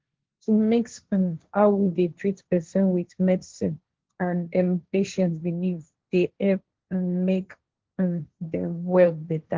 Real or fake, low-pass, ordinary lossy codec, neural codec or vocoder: fake; 7.2 kHz; Opus, 16 kbps; codec, 16 kHz, 1.1 kbps, Voila-Tokenizer